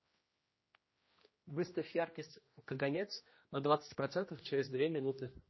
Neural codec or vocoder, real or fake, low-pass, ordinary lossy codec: codec, 16 kHz, 1 kbps, X-Codec, HuBERT features, trained on general audio; fake; 7.2 kHz; MP3, 24 kbps